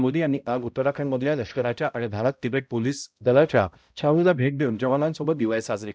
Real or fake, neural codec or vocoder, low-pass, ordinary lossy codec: fake; codec, 16 kHz, 0.5 kbps, X-Codec, HuBERT features, trained on balanced general audio; none; none